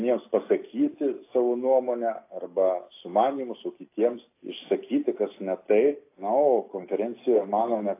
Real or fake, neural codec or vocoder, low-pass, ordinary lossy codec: real; none; 3.6 kHz; AAC, 24 kbps